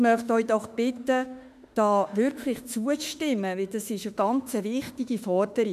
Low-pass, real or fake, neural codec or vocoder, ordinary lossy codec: 14.4 kHz; fake; autoencoder, 48 kHz, 32 numbers a frame, DAC-VAE, trained on Japanese speech; none